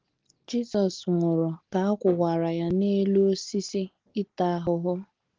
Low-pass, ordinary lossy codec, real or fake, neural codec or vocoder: 7.2 kHz; Opus, 16 kbps; real; none